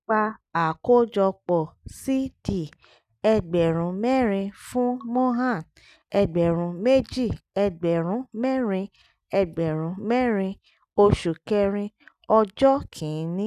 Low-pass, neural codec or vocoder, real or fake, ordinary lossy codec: 14.4 kHz; none; real; none